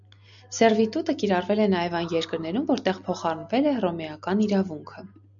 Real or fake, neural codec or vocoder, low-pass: real; none; 7.2 kHz